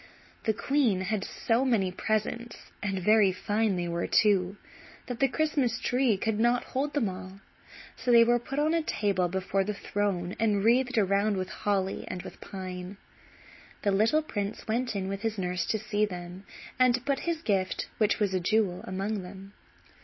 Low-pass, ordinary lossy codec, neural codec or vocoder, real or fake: 7.2 kHz; MP3, 24 kbps; none; real